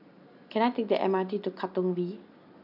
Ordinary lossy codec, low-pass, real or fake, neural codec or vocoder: AAC, 48 kbps; 5.4 kHz; real; none